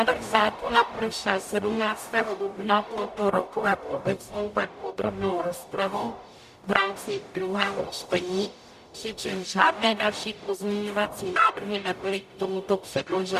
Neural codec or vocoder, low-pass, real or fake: codec, 44.1 kHz, 0.9 kbps, DAC; 14.4 kHz; fake